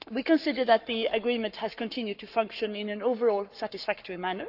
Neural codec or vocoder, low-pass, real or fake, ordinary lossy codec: codec, 44.1 kHz, 7.8 kbps, Pupu-Codec; 5.4 kHz; fake; none